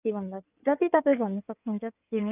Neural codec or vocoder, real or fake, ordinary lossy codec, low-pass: codec, 16 kHz in and 24 kHz out, 2.2 kbps, FireRedTTS-2 codec; fake; none; 3.6 kHz